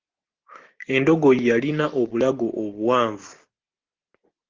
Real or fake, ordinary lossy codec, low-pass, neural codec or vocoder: real; Opus, 16 kbps; 7.2 kHz; none